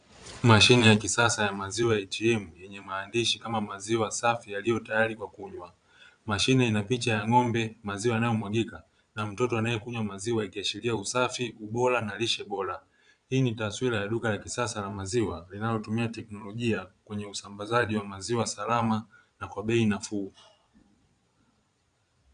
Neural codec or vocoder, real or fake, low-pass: vocoder, 22.05 kHz, 80 mel bands, Vocos; fake; 9.9 kHz